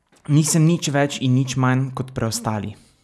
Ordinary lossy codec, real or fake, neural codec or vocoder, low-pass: none; real; none; none